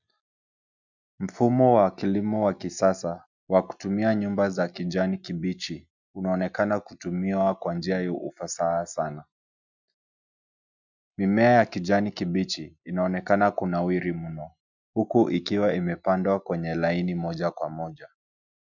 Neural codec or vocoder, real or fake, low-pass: none; real; 7.2 kHz